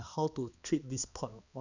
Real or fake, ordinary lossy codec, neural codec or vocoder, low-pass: fake; none; codec, 16 kHz, 2 kbps, X-Codec, HuBERT features, trained on balanced general audio; 7.2 kHz